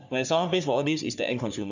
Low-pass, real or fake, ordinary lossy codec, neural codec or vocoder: 7.2 kHz; fake; none; codec, 44.1 kHz, 3.4 kbps, Pupu-Codec